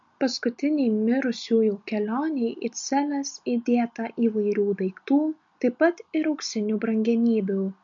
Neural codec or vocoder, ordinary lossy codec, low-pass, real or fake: none; MP3, 48 kbps; 7.2 kHz; real